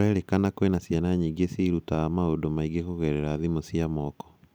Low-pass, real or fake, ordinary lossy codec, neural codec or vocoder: none; real; none; none